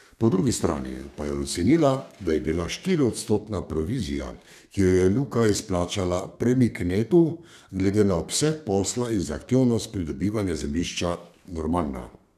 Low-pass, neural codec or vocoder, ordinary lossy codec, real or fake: 14.4 kHz; codec, 32 kHz, 1.9 kbps, SNAC; none; fake